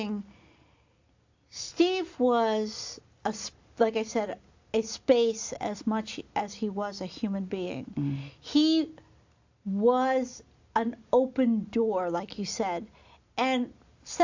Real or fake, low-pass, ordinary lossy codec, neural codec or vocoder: real; 7.2 kHz; AAC, 48 kbps; none